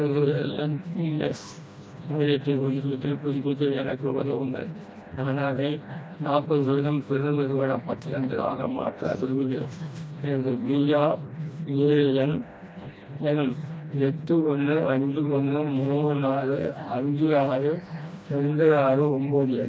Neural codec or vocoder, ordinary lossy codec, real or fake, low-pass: codec, 16 kHz, 1 kbps, FreqCodec, smaller model; none; fake; none